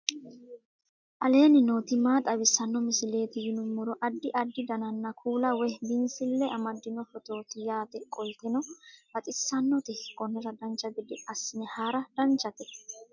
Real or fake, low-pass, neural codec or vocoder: real; 7.2 kHz; none